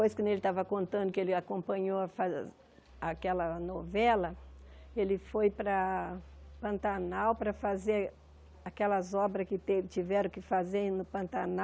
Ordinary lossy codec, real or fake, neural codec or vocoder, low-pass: none; real; none; none